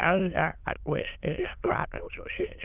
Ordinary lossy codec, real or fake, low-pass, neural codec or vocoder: Opus, 24 kbps; fake; 3.6 kHz; autoencoder, 22.05 kHz, a latent of 192 numbers a frame, VITS, trained on many speakers